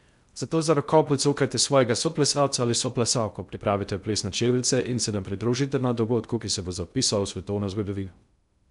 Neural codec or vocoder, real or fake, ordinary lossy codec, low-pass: codec, 16 kHz in and 24 kHz out, 0.6 kbps, FocalCodec, streaming, 2048 codes; fake; none; 10.8 kHz